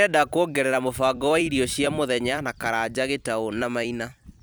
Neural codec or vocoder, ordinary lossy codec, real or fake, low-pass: vocoder, 44.1 kHz, 128 mel bands every 256 samples, BigVGAN v2; none; fake; none